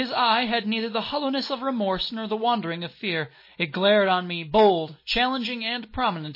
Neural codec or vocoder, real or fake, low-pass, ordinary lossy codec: none; real; 5.4 kHz; MP3, 24 kbps